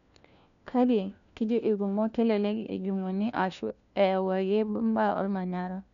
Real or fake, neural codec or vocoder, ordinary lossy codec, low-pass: fake; codec, 16 kHz, 1 kbps, FunCodec, trained on LibriTTS, 50 frames a second; none; 7.2 kHz